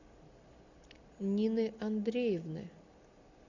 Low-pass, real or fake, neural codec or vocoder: 7.2 kHz; real; none